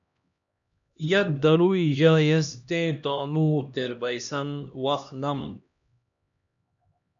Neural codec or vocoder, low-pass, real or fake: codec, 16 kHz, 1 kbps, X-Codec, HuBERT features, trained on LibriSpeech; 7.2 kHz; fake